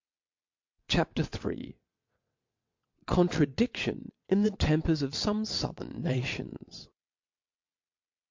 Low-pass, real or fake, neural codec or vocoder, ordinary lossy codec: 7.2 kHz; fake; vocoder, 22.05 kHz, 80 mel bands, WaveNeXt; MP3, 48 kbps